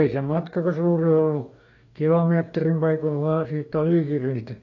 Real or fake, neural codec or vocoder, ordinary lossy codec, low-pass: fake; codec, 44.1 kHz, 2.6 kbps, DAC; AAC, 48 kbps; 7.2 kHz